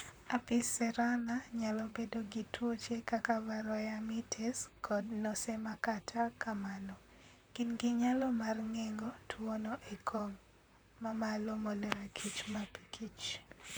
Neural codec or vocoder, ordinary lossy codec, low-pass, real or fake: vocoder, 44.1 kHz, 128 mel bands, Pupu-Vocoder; none; none; fake